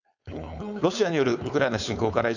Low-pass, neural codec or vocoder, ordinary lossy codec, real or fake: 7.2 kHz; codec, 16 kHz, 4.8 kbps, FACodec; none; fake